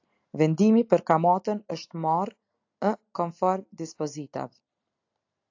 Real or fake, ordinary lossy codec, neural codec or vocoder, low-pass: real; AAC, 48 kbps; none; 7.2 kHz